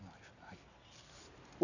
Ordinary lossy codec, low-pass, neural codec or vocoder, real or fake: none; 7.2 kHz; none; real